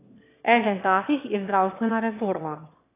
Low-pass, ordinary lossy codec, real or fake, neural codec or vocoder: 3.6 kHz; AAC, 24 kbps; fake; autoencoder, 22.05 kHz, a latent of 192 numbers a frame, VITS, trained on one speaker